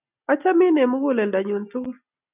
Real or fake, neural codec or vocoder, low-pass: real; none; 3.6 kHz